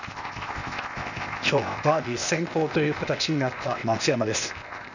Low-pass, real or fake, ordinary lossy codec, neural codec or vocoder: 7.2 kHz; fake; none; codec, 16 kHz, 0.8 kbps, ZipCodec